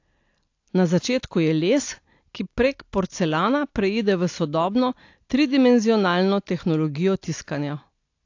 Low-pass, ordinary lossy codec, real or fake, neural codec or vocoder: 7.2 kHz; AAC, 48 kbps; real; none